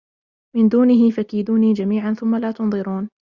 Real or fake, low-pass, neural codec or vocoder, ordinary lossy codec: real; 7.2 kHz; none; Opus, 64 kbps